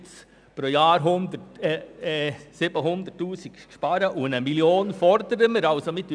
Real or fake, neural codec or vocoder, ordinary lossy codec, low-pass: real; none; none; 9.9 kHz